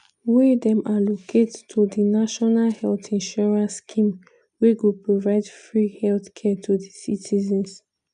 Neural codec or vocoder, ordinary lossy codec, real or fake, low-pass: none; none; real; 9.9 kHz